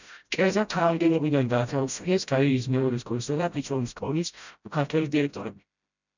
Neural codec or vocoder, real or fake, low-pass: codec, 16 kHz, 0.5 kbps, FreqCodec, smaller model; fake; 7.2 kHz